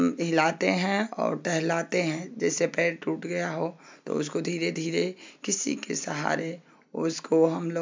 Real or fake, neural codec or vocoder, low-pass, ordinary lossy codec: real; none; 7.2 kHz; MP3, 64 kbps